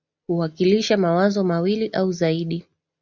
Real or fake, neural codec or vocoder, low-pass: real; none; 7.2 kHz